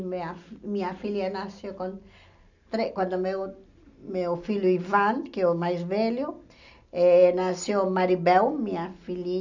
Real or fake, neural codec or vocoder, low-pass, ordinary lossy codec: real; none; 7.2 kHz; none